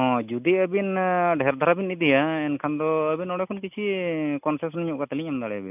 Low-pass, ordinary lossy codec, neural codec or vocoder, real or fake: 3.6 kHz; none; none; real